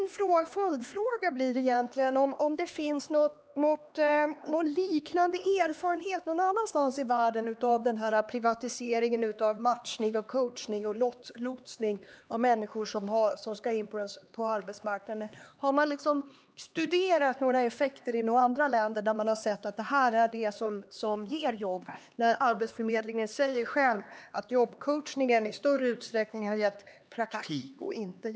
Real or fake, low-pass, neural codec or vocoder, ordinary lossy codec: fake; none; codec, 16 kHz, 2 kbps, X-Codec, HuBERT features, trained on LibriSpeech; none